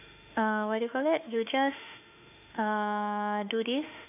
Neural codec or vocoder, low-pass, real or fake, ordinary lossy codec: autoencoder, 48 kHz, 32 numbers a frame, DAC-VAE, trained on Japanese speech; 3.6 kHz; fake; none